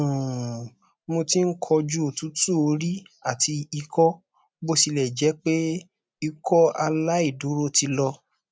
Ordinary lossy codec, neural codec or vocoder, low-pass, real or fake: none; none; none; real